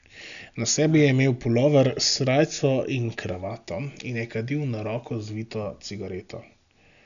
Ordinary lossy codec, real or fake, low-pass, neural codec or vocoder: none; real; 7.2 kHz; none